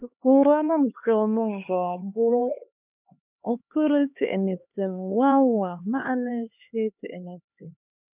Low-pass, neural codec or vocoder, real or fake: 3.6 kHz; codec, 16 kHz, 2 kbps, X-Codec, HuBERT features, trained on LibriSpeech; fake